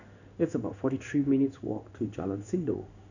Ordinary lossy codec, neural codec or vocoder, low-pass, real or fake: none; codec, 16 kHz in and 24 kHz out, 1 kbps, XY-Tokenizer; 7.2 kHz; fake